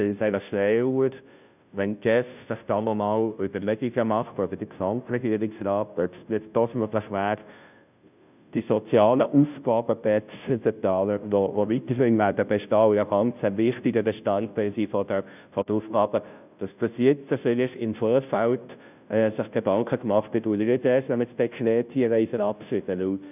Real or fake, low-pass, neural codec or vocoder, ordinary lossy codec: fake; 3.6 kHz; codec, 16 kHz, 0.5 kbps, FunCodec, trained on Chinese and English, 25 frames a second; none